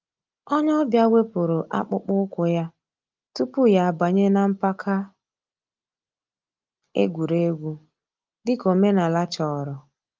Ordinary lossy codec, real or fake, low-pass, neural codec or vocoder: Opus, 24 kbps; real; 7.2 kHz; none